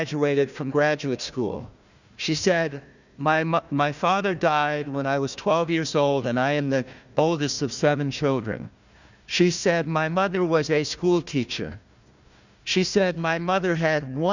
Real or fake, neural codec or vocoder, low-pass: fake; codec, 16 kHz, 1 kbps, FunCodec, trained on Chinese and English, 50 frames a second; 7.2 kHz